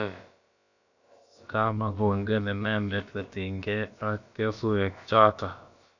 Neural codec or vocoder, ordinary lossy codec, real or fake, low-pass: codec, 16 kHz, about 1 kbps, DyCAST, with the encoder's durations; none; fake; 7.2 kHz